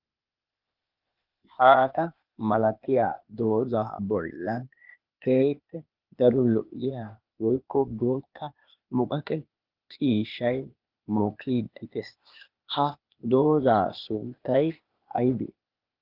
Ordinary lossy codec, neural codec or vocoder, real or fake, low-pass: Opus, 24 kbps; codec, 16 kHz, 0.8 kbps, ZipCodec; fake; 5.4 kHz